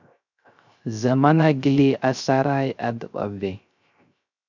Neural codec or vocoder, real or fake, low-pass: codec, 16 kHz, 0.3 kbps, FocalCodec; fake; 7.2 kHz